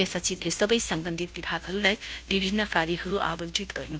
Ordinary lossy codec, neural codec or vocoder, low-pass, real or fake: none; codec, 16 kHz, 0.5 kbps, FunCodec, trained on Chinese and English, 25 frames a second; none; fake